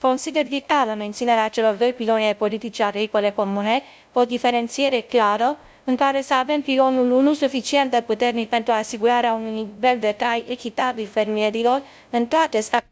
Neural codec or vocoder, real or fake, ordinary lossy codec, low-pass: codec, 16 kHz, 0.5 kbps, FunCodec, trained on LibriTTS, 25 frames a second; fake; none; none